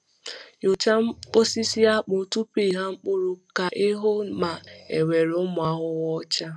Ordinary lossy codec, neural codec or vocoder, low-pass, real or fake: none; none; none; real